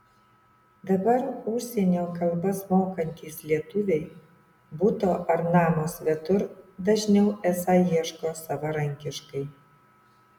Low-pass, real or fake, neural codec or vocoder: 19.8 kHz; real; none